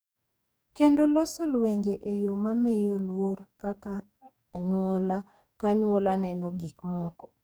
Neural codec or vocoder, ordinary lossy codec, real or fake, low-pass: codec, 44.1 kHz, 2.6 kbps, DAC; none; fake; none